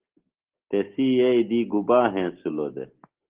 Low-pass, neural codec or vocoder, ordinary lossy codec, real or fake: 3.6 kHz; none; Opus, 16 kbps; real